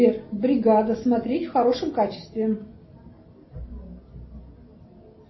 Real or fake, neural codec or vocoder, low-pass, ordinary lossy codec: real; none; 7.2 kHz; MP3, 24 kbps